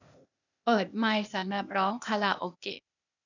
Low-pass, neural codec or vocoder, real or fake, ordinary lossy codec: 7.2 kHz; codec, 16 kHz, 0.8 kbps, ZipCodec; fake; none